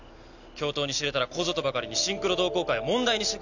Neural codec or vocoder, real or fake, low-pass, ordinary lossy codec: none; real; 7.2 kHz; none